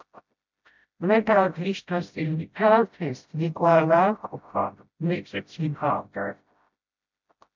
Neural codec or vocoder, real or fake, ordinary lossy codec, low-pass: codec, 16 kHz, 0.5 kbps, FreqCodec, smaller model; fake; MP3, 64 kbps; 7.2 kHz